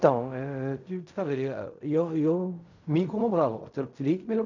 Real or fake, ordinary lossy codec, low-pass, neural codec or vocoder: fake; none; 7.2 kHz; codec, 16 kHz in and 24 kHz out, 0.4 kbps, LongCat-Audio-Codec, fine tuned four codebook decoder